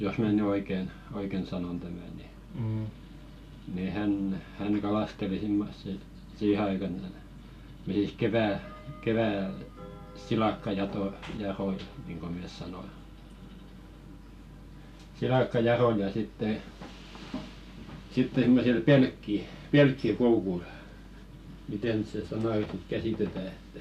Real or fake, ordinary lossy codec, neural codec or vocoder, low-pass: real; none; none; 14.4 kHz